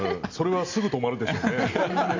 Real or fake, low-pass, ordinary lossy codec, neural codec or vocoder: real; 7.2 kHz; AAC, 48 kbps; none